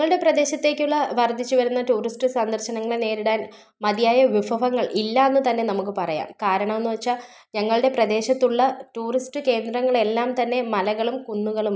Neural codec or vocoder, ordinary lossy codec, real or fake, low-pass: none; none; real; none